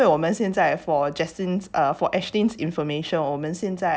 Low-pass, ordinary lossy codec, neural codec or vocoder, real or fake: none; none; none; real